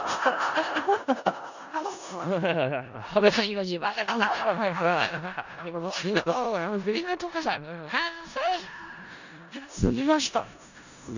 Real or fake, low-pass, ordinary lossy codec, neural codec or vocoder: fake; 7.2 kHz; none; codec, 16 kHz in and 24 kHz out, 0.4 kbps, LongCat-Audio-Codec, four codebook decoder